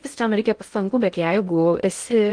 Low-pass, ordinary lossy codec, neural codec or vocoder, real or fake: 9.9 kHz; Opus, 24 kbps; codec, 16 kHz in and 24 kHz out, 0.6 kbps, FocalCodec, streaming, 4096 codes; fake